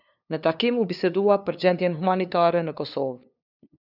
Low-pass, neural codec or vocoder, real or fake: 5.4 kHz; codec, 16 kHz, 2 kbps, FunCodec, trained on LibriTTS, 25 frames a second; fake